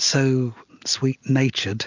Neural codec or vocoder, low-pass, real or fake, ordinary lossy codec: none; 7.2 kHz; real; MP3, 64 kbps